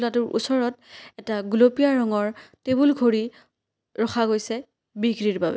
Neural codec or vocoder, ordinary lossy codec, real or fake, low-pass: none; none; real; none